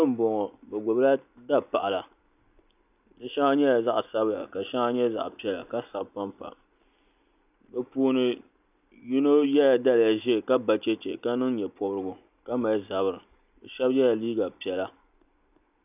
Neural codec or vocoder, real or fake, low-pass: none; real; 3.6 kHz